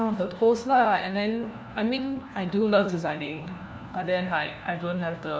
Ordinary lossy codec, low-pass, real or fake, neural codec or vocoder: none; none; fake; codec, 16 kHz, 1 kbps, FunCodec, trained on LibriTTS, 50 frames a second